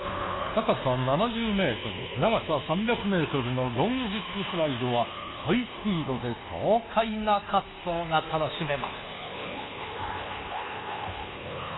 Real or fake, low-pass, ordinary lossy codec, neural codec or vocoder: fake; 7.2 kHz; AAC, 16 kbps; codec, 24 kHz, 1.2 kbps, DualCodec